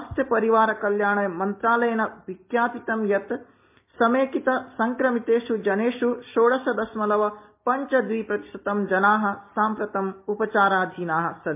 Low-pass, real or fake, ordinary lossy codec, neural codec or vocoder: 3.6 kHz; real; MP3, 32 kbps; none